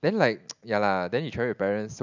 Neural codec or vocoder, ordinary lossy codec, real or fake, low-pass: none; none; real; 7.2 kHz